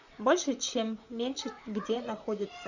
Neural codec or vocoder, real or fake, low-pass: vocoder, 44.1 kHz, 128 mel bands, Pupu-Vocoder; fake; 7.2 kHz